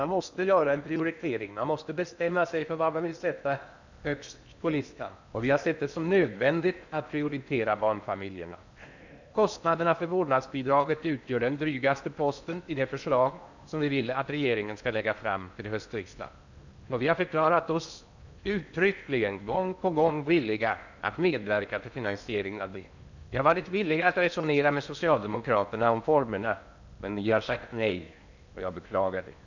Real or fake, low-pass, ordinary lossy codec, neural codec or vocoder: fake; 7.2 kHz; none; codec, 16 kHz in and 24 kHz out, 0.8 kbps, FocalCodec, streaming, 65536 codes